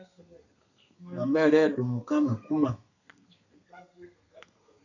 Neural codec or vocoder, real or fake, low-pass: codec, 32 kHz, 1.9 kbps, SNAC; fake; 7.2 kHz